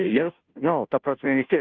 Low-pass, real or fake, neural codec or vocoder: 7.2 kHz; fake; codec, 16 kHz, 0.5 kbps, FunCodec, trained on Chinese and English, 25 frames a second